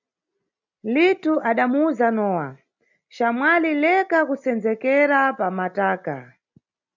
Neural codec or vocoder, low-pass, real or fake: none; 7.2 kHz; real